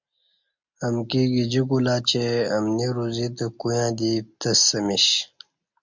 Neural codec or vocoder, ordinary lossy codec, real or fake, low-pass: none; MP3, 64 kbps; real; 7.2 kHz